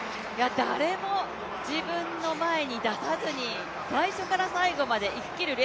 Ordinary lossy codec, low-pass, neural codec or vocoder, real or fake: none; none; none; real